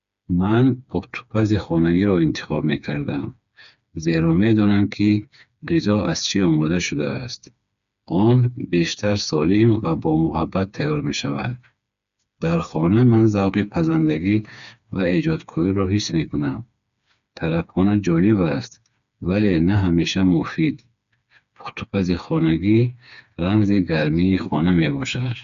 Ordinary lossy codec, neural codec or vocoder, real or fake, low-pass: none; codec, 16 kHz, 4 kbps, FreqCodec, smaller model; fake; 7.2 kHz